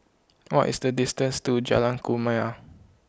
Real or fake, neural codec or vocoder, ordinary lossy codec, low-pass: real; none; none; none